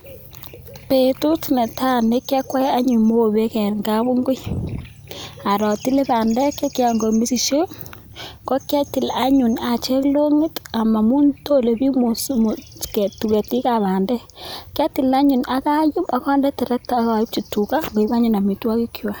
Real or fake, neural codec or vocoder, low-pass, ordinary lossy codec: real; none; none; none